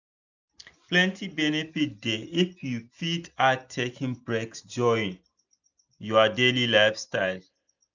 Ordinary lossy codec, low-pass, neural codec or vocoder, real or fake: none; 7.2 kHz; none; real